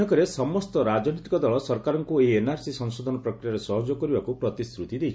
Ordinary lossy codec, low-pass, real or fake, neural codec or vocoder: none; none; real; none